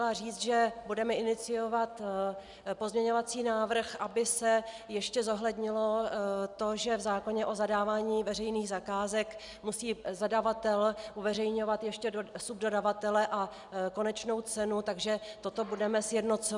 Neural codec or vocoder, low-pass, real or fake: none; 10.8 kHz; real